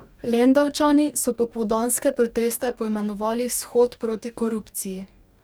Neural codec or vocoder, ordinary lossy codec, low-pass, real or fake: codec, 44.1 kHz, 2.6 kbps, DAC; none; none; fake